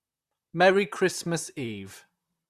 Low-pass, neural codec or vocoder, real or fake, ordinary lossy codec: 14.4 kHz; vocoder, 44.1 kHz, 128 mel bands, Pupu-Vocoder; fake; Opus, 64 kbps